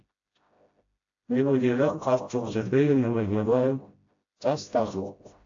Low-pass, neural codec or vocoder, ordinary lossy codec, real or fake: 7.2 kHz; codec, 16 kHz, 0.5 kbps, FreqCodec, smaller model; AAC, 48 kbps; fake